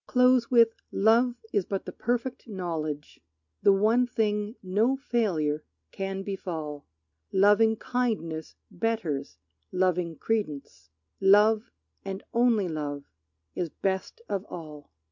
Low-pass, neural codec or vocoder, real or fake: 7.2 kHz; none; real